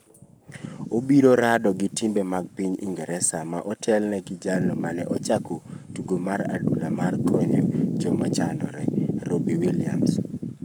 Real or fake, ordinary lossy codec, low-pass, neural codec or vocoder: fake; none; none; codec, 44.1 kHz, 7.8 kbps, Pupu-Codec